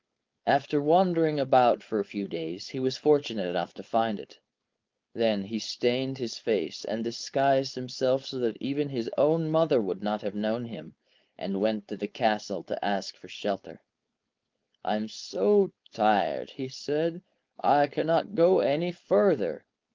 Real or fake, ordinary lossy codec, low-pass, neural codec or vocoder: fake; Opus, 24 kbps; 7.2 kHz; codec, 16 kHz, 4.8 kbps, FACodec